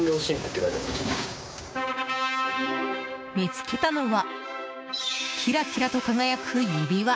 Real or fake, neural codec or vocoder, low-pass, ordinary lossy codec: fake; codec, 16 kHz, 6 kbps, DAC; none; none